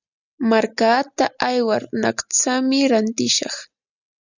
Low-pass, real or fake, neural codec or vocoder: 7.2 kHz; real; none